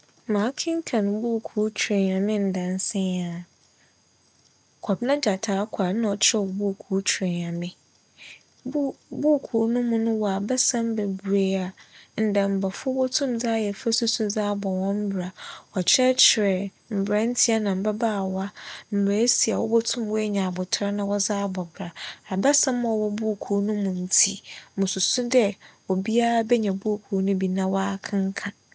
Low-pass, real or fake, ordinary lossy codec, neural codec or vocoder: none; real; none; none